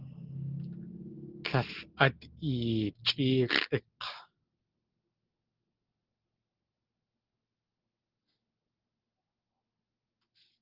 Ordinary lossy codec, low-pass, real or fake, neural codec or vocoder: Opus, 16 kbps; 5.4 kHz; real; none